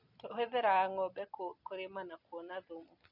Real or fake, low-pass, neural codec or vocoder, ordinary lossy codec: real; 5.4 kHz; none; none